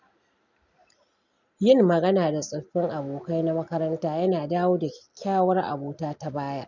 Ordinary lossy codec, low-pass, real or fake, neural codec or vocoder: none; 7.2 kHz; real; none